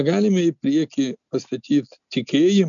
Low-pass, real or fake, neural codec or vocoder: 7.2 kHz; real; none